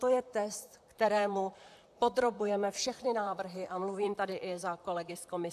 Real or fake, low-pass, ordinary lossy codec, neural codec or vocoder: fake; 14.4 kHz; AAC, 96 kbps; vocoder, 44.1 kHz, 128 mel bands, Pupu-Vocoder